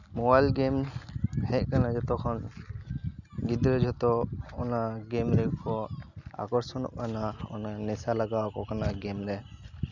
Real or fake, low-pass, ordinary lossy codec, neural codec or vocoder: real; 7.2 kHz; none; none